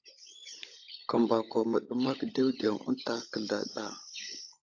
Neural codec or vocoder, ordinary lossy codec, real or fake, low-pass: codec, 16 kHz, 16 kbps, FunCodec, trained on LibriTTS, 50 frames a second; AAC, 48 kbps; fake; 7.2 kHz